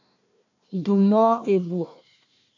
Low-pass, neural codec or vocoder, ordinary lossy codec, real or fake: 7.2 kHz; codec, 16 kHz, 1 kbps, FunCodec, trained on Chinese and English, 50 frames a second; AAC, 48 kbps; fake